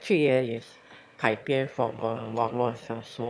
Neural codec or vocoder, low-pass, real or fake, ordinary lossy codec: autoencoder, 22.05 kHz, a latent of 192 numbers a frame, VITS, trained on one speaker; none; fake; none